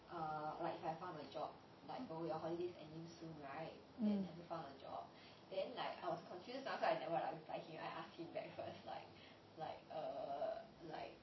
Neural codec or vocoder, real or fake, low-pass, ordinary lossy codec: none; real; 7.2 kHz; MP3, 24 kbps